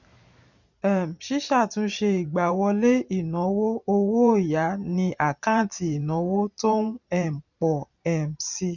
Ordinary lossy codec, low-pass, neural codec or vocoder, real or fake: none; 7.2 kHz; vocoder, 44.1 kHz, 128 mel bands every 512 samples, BigVGAN v2; fake